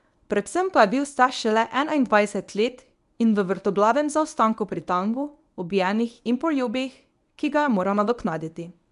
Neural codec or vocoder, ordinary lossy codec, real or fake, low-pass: codec, 24 kHz, 0.9 kbps, WavTokenizer, medium speech release version 1; none; fake; 10.8 kHz